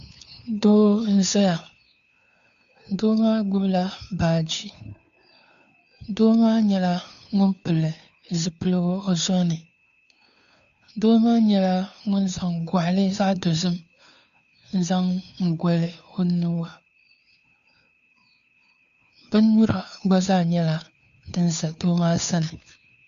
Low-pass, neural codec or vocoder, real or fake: 7.2 kHz; codec, 16 kHz, 2 kbps, FunCodec, trained on Chinese and English, 25 frames a second; fake